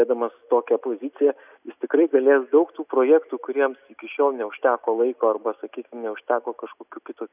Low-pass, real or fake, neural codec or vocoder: 3.6 kHz; real; none